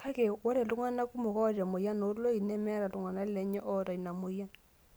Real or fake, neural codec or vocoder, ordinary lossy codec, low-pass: real; none; none; none